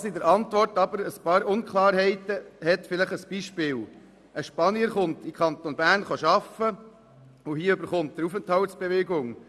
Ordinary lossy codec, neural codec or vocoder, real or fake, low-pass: none; none; real; none